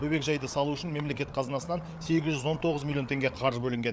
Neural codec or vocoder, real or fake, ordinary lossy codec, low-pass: codec, 16 kHz, 8 kbps, FreqCodec, larger model; fake; none; none